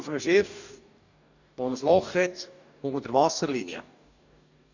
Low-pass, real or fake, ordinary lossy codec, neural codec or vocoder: 7.2 kHz; fake; none; codec, 44.1 kHz, 2.6 kbps, DAC